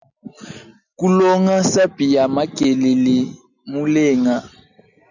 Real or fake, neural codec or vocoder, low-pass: real; none; 7.2 kHz